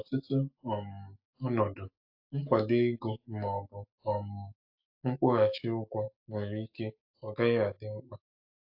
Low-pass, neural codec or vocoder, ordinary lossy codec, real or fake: 5.4 kHz; autoencoder, 48 kHz, 128 numbers a frame, DAC-VAE, trained on Japanese speech; AAC, 48 kbps; fake